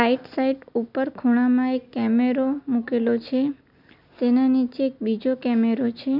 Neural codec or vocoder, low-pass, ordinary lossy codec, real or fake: none; 5.4 kHz; none; real